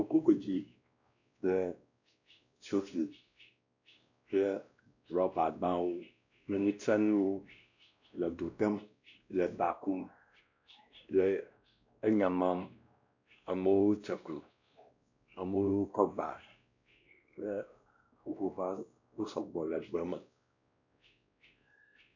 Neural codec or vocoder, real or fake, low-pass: codec, 16 kHz, 1 kbps, X-Codec, WavLM features, trained on Multilingual LibriSpeech; fake; 7.2 kHz